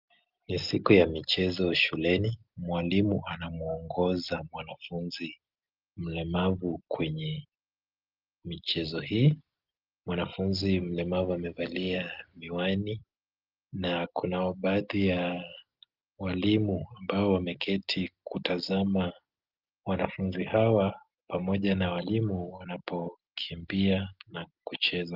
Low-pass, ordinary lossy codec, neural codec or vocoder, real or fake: 5.4 kHz; Opus, 24 kbps; none; real